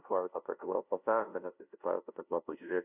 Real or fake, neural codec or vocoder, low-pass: fake; codec, 16 kHz, 0.5 kbps, FunCodec, trained on LibriTTS, 25 frames a second; 3.6 kHz